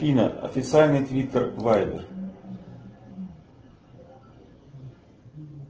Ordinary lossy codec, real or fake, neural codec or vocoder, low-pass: Opus, 16 kbps; real; none; 7.2 kHz